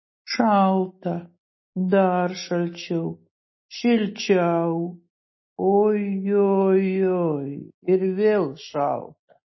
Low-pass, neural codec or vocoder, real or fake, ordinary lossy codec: 7.2 kHz; none; real; MP3, 24 kbps